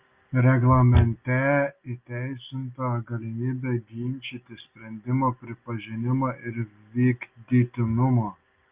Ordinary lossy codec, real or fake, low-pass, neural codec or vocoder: Opus, 24 kbps; real; 3.6 kHz; none